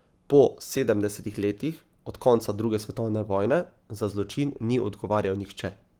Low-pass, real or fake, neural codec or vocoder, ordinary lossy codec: 19.8 kHz; fake; codec, 44.1 kHz, 7.8 kbps, Pupu-Codec; Opus, 24 kbps